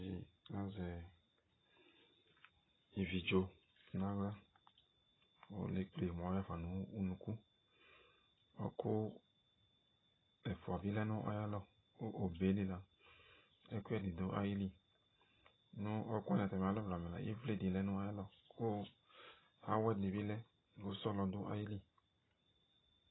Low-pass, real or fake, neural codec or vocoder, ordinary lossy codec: 7.2 kHz; real; none; AAC, 16 kbps